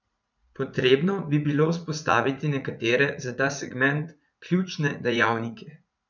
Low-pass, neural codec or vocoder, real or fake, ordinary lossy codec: 7.2 kHz; vocoder, 44.1 kHz, 80 mel bands, Vocos; fake; none